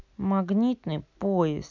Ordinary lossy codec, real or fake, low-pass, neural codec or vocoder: none; real; 7.2 kHz; none